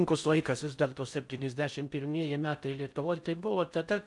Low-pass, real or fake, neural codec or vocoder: 10.8 kHz; fake; codec, 16 kHz in and 24 kHz out, 0.6 kbps, FocalCodec, streaming, 4096 codes